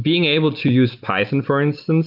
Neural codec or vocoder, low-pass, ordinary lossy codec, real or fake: none; 5.4 kHz; Opus, 24 kbps; real